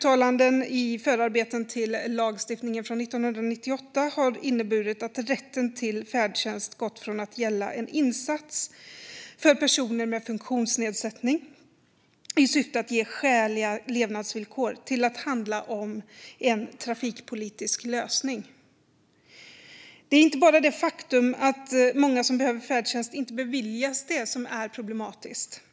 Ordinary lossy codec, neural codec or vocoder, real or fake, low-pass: none; none; real; none